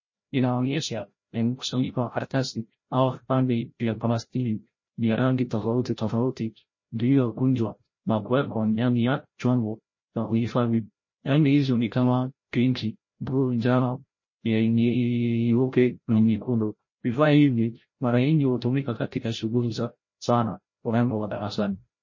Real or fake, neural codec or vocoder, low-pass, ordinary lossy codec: fake; codec, 16 kHz, 0.5 kbps, FreqCodec, larger model; 7.2 kHz; MP3, 32 kbps